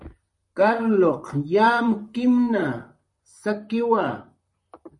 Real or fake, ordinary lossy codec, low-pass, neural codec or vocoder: fake; MP3, 48 kbps; 10.8 kHz; vocoder, 44.1 kHz, 128 mel bands, Pupu-Vocoder